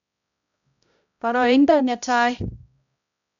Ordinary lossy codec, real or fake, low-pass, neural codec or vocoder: none; fake; 7.2 kHz; codec, 16 kHz, 0.5 kbps, X-Codec, HuBERT features, trained on balanced general audio